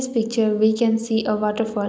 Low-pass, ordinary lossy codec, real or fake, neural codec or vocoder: none; none; real; none